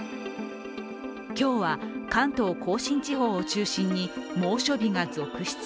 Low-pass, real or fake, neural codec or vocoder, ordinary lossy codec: none; real; none; none